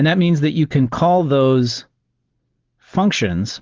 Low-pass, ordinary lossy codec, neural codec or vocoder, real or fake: 7.2 kHz; Opus, 16 kbps; none; real